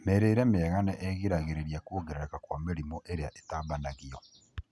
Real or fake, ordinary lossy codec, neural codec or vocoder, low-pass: real; none; none; none